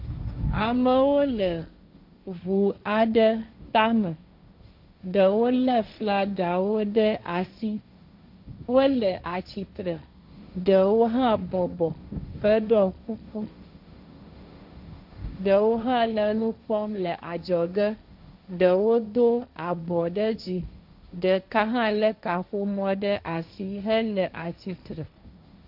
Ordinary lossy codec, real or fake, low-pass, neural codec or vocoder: AAC, 48 kbps; fake; 5.4 kHz; codec, 16 kHz, 1.1 kbps, Voila-Tokenizer